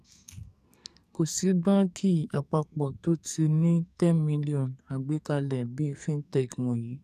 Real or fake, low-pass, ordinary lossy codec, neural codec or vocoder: fake; 14.4 kHz; none; codec, 44.1 kHz, 2.6 kbps, SNAC